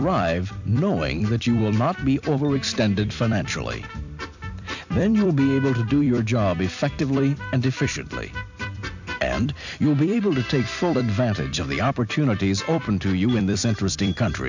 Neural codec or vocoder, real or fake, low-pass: vocoder, 44.1 kHz, 128 mel bands every 256 samples, BigVGAN v2; fake; 7.2 kHz